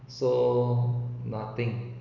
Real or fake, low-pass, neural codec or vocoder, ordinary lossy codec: real; 7.2 kHz; none; none